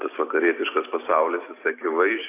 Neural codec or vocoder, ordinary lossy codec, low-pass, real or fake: none; AAC, 24 kbps; 3.6 kHz; real